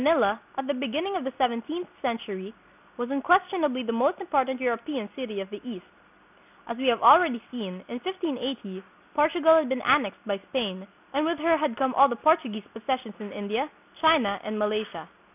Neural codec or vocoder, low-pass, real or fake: none; 3.6 kHz; real